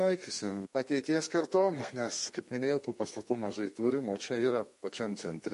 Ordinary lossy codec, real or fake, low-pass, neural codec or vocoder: MP3, 48 kbps; fake; 14.4 kHz; codec, 32 kHz, 1.9 kbps, SNAC